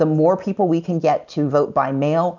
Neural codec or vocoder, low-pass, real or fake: none; 7.2 kHz; real